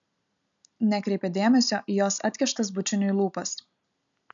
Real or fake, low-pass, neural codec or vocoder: real; 7.2 kHz; none